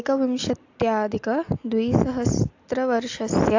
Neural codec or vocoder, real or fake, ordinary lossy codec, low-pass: none; real; AAC, 48 kbps; 7.2 kHz